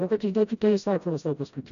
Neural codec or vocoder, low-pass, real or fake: codec, 16 kHz, 0.5 kbps, FreqCodec, smaller model; 7.2 kHz; fake